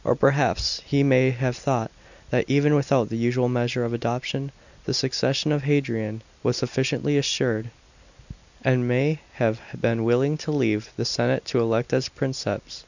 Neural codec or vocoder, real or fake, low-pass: none; real; 7.2 kHz